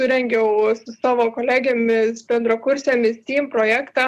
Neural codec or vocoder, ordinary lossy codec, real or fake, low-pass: none; Opus, 64 kbps; real; 14.4 kHz